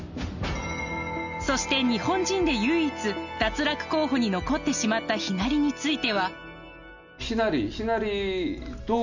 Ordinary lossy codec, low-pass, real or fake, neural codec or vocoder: none; 7.2 kHz; real; none